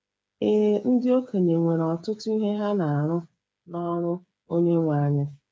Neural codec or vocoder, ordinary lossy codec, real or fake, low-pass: codec, 16 kHz, 8 kbps, FreqCodec, smaller model; none; fake; none